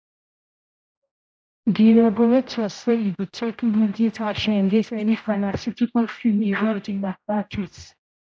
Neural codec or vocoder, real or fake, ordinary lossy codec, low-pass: codec, 16 kHz, 0.5 kbps, X-Codec, HuBERT features, trained on general audio; fake; none; none